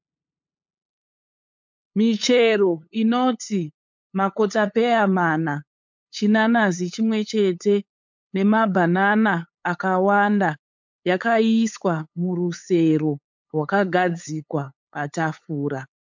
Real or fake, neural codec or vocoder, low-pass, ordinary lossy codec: fake; codec, 16 kHz, 8 kbps, FunCodec, trained on LibriTTS, 25 frames a second; 7.2 kHz; MP3, 64 kbps